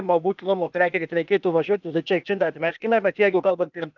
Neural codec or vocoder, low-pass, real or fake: codec, 16 kHz, 0.8 kbps, ZipCodec; 7.2 kHz; fake